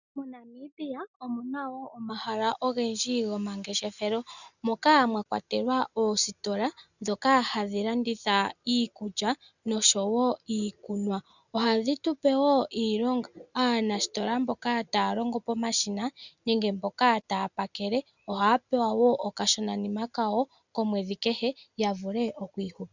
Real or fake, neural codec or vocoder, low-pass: real; none; 7.2 kHz